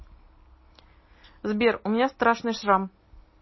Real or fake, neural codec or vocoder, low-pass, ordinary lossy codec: real; none; 7.2 kHz; MP3, 24 kbps